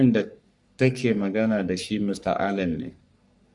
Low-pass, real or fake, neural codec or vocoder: 10.8 kHz; fake; codec, 44.1 kHz, 3.4 kbps, Pupu-Codec